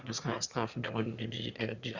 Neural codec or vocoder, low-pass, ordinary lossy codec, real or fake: autoencoder, 22.05 kHz, a latent of 192 numbers a frame, VITS, trained on one speaker; 7.2 kHz; Opus, 64 kbps; fake